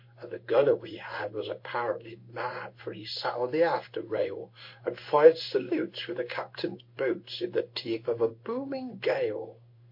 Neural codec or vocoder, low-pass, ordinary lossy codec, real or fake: codec, 16 kHz in and 24 kHz out, 1 kbps, XY-Tokenizer; 5.4 kHz; MP3, 32 kbps; fake